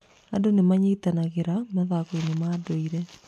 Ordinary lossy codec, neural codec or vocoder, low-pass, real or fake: none; none; 14.4 kHz; real